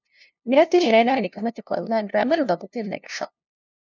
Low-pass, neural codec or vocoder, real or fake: 7.2 kHz; codec, 16 kHz, 0.5 kbps, FunCodec, trained on LibriTTS, 25 frames a second; fake